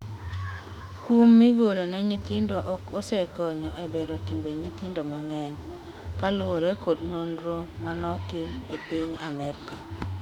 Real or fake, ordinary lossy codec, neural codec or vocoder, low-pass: fake; none; autoencoder, 48 kHz, 32 numbers a frame, DAC-VAE, trained on Japanese speech; 19.8 kHz